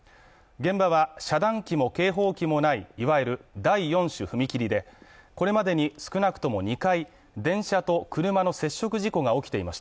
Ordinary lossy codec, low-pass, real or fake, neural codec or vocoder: none; none; real; none